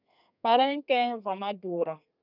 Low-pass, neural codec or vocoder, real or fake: 5.4 kHz; codec, 32 kHz, 1.9 kbps, SNAC; fake